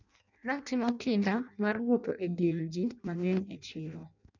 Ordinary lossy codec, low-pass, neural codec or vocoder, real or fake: none; 7.2 kHz; codec, 16 kHz in and 24 kHz out, 0.6 kbps, FireRedTTS-2 codec; fake